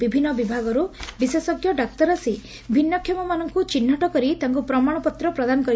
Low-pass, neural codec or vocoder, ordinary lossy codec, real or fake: none; none; none; real